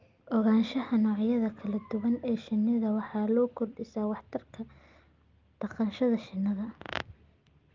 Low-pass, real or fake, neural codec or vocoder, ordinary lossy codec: 7.2 kHz; real; none; Opus, 32 kbps